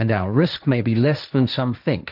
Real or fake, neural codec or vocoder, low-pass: fake; codec, 16 kHz, 1.1 kbps, Voila-Tokenizer; 5.4 kHz